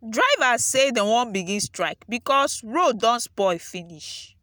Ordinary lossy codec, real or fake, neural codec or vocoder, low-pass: none; real; none; none